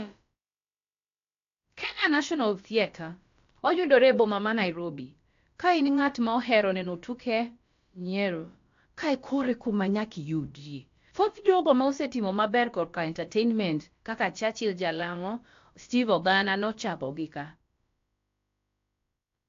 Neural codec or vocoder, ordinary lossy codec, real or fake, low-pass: codec, 16 kHz, about 1 kbps, DyCAST, with the encoder's durations; AAC, 48 kbps; fake; 7.2 kHz